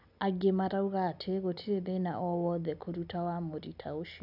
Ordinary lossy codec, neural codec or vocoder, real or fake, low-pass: none; none; real; 5.4 kHz